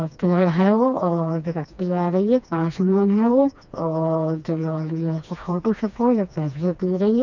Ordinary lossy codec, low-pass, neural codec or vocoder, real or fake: none; 7.2 kHz; codec, 16 kHz, 2 kbps, FreqCodec, smaller model; fake